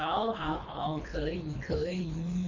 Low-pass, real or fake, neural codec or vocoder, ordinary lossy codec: 7.2 kHz; fake; codec, 24 kHz, 3 kbps, HILCodec; none